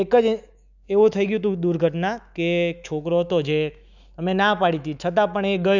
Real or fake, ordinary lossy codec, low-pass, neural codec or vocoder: fake; none; 7.2 kHz; autoencoder, 48 kHz, 128 numbers a frame, DAC-VAE, trained on Japanese speech